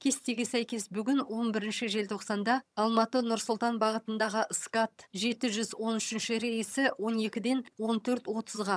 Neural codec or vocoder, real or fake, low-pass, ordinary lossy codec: vocoder, 22.05 kHz, 80 mel bands, HiFi-GAN; fake; none; none